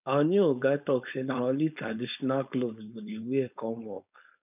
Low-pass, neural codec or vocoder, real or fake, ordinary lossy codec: 3.6 kHz; codec, 16 kHz, 4.8 kbps, FACodec; fake; none